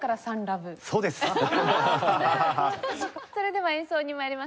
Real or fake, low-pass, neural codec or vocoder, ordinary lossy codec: real; none; none; none